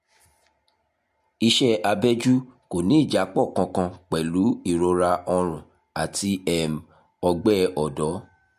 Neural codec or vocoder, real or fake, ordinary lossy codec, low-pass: none; real; MP3, 64 kbps; 14.4 kHz